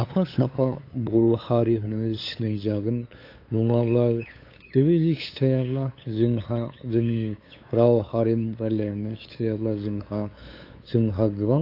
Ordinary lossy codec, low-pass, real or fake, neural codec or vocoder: AAC, 32 kbps; 5.4 kHz; fake; codec, 16 kHz, 8 kbps, FunCodec, trained on LibriTTS, 25 frames a second